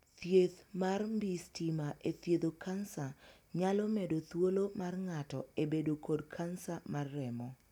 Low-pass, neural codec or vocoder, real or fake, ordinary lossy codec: 19.8 kHz; none; real; none